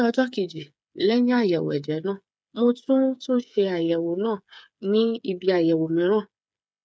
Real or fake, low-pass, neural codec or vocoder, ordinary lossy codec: fake; none; codec, 16 kHz, 8 kbps, FreqCodec, smaller model; none